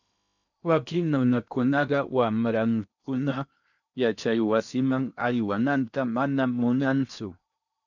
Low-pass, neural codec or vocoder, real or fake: 7.2 kHz; codec, 16 kHz in and 24 kHz out, 0.8 kbps, FocalCodec, streaming, 65536 codes; fake